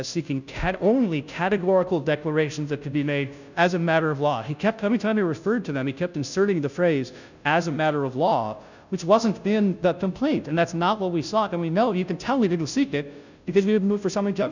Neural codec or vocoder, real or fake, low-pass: codec, 16 kHz, 0.5 kbps, FunCodec, trained on Chinese and English, 25 frames a second; fake; 7.2 kHz